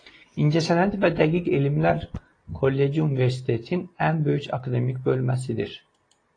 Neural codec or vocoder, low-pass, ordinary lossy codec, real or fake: none; 9.9 kHz; AAC, 32 kbps; real